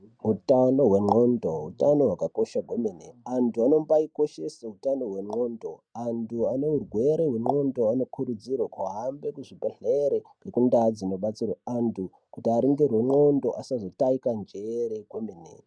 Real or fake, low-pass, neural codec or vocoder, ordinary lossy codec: real; 9.9 kHz; none; MP3, 96 kbps